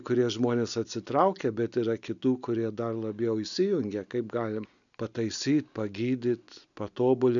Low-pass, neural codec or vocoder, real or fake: 7.2 kHz; none; real